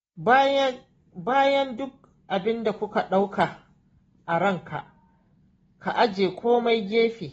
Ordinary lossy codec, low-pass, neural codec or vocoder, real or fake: AAC, 24 kbps; 19.8 kHz; none; real